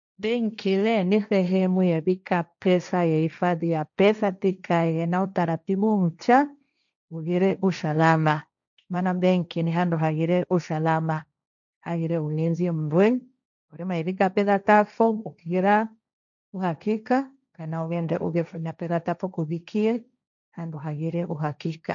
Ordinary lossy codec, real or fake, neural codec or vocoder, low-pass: none; fake; codec, 16 kHz, 1.1 kbps, Voila-Tokenizer; 7.2 kHz